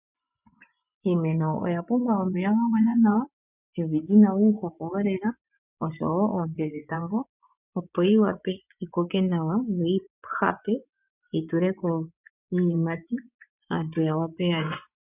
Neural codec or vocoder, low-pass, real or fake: vocoder, 24 kHz, 100 mel bands, Vocos; 3.6 kHz; fake